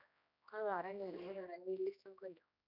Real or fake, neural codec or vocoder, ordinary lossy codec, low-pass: fake; codec, 16 kHz, 1 kbps, X-Codec, HuBERT features, trained on general audio; none; 5.4 kHz